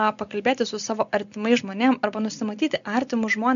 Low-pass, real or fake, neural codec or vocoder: 7.2 kHz; real; none